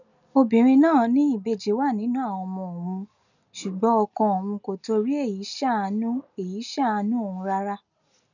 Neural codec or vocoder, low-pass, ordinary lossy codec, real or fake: none; 7.2 kHz; none; real